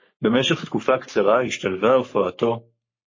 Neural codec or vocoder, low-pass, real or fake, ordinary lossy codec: codec, 44.1 kHz, 3.4 kbps, Pupu-Codec; 7.2 kHz; fake; MP3, 32 kbps